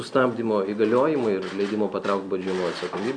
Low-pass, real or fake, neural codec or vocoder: 9.9 kHz; real; none